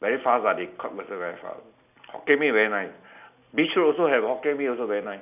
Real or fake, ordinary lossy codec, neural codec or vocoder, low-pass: real; none; none; 3.6 kHz